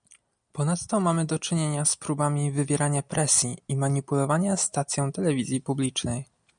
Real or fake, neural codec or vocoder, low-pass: real; none; 9.9 kHz